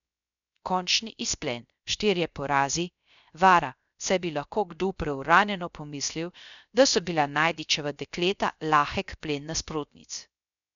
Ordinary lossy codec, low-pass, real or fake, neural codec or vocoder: none; 7.2 kHz; fake; codec, 16 kHz, 0.3 kbps, FocalCodec